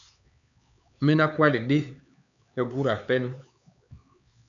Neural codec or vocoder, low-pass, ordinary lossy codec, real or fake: codec, 16 kHz, 4 kbps, X-Codec, HuBERT features, trained on LibriSpeech; 7.2 kHz; MP3, 96 kbps; fake